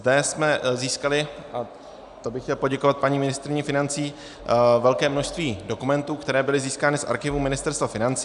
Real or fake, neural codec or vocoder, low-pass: real; none; 10.8 kHz